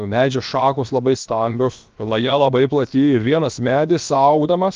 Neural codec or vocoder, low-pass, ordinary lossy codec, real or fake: codec, 16 kHz, about 1 kbps, DyCAST, with the encoder's durations; 7.2 kHz; Opus, 32 kbps; fake